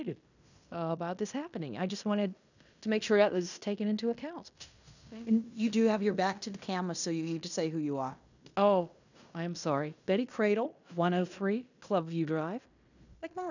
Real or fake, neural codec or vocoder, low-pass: fake; codec, 16 kHz in and 24 kHz out, 0.9 kbps, LongCat-Audio-Codec, fine tuned four codebook decoder; 7.2 kHz